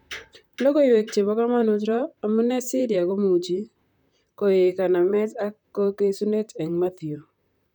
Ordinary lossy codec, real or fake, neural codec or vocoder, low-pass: none; fake; vocoder, 44.1 kHz, 128 mel bands, Pupu-Vocoder; 19.8 kHz